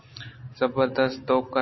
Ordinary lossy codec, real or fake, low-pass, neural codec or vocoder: MP3, 24 kbps; real; 7.2 kHz; none